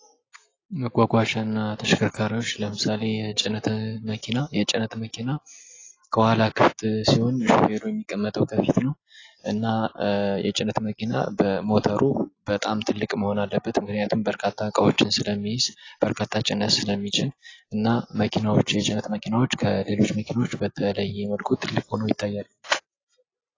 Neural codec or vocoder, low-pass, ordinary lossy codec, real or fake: none; 7.2 kHz; AAC, 32 kbps; real